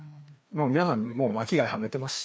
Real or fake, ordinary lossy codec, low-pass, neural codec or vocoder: fake; none; none; codec, 16 kHz, 2 kbps, FreqCodec, larger model